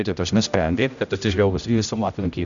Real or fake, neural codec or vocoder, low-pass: fake; codec, 16 kHz, 0.5 kbps, X-Codec, HuBERT features, trained on general audio; 7.2 kHz